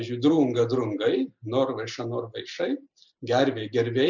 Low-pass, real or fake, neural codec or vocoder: 7.2 kHz; real; none